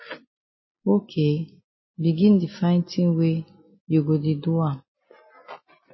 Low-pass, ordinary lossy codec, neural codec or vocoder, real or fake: 7.2 kHz; MP3, 24 kbps; none; real